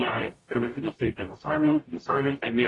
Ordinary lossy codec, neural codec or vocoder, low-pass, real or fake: AAC, 32 kbps; codec, 44.1 kHz, 0.9 kbps, DAC; 10.8 kHz; fake